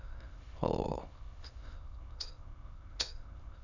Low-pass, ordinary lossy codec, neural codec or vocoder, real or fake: 7.2 kHz; none; autoencoder, 22.05 kHz, a latent of 192 numbers a frame, VITS, trained on many speakers; fake